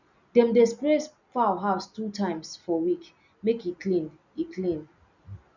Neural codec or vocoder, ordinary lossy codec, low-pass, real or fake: none; none; 7.2 kHz; real